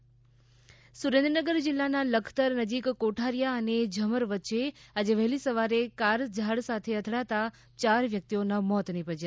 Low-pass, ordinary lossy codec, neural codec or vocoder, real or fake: none; none; none; real